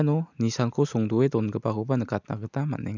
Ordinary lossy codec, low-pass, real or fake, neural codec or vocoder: none; 7.2 kHz; real; none